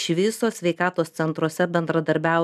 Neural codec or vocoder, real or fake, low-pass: none; real; 14.4 kHz